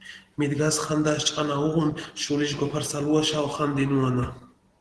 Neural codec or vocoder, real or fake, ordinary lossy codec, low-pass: none; real; Opus, 16 kbps; 10.8 kHz